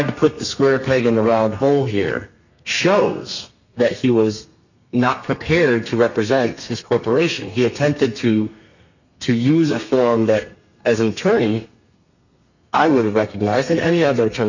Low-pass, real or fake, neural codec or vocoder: 7.2 kHz; fake; codec, 32 kHz, 1.9 kbps, SNAC